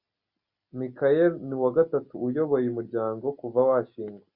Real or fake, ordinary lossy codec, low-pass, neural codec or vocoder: real; Opus, 64 kbps; 5.4 kHz; none